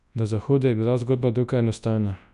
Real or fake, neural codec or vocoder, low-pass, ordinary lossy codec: fake; codec, 24 kHz, 0.9 kbps, WavTokenizer, large speech release; 10.8 kHz; none